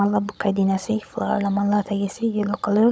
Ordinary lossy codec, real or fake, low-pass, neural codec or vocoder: none; fake; none; codec, 16 kHz, 16 kbps, FunCodec, trained on Chinese and English, 50 frames a second